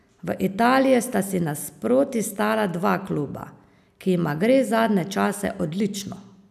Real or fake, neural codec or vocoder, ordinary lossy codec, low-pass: real; none; none; 14.4 kHz